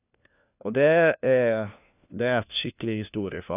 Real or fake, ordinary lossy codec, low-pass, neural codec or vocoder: fake; none; 3.6 kHz; codec, 16 kHz, 1 kbps, FunCodec, trained on LibriTTS, 50 frames a second